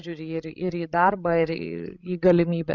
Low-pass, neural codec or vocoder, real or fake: 7.2 kHz; codec, 16 kHz, 16 kbps, FreqCodec, larger model; fake